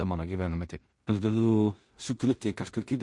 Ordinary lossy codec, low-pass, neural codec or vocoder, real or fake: MP3, 48 kbps; 10.8 kHz; codec, 16 kHz in and 24 kHz out, 0.4 kbps, LongCat-Audio-Codec, two codebook decoder; fake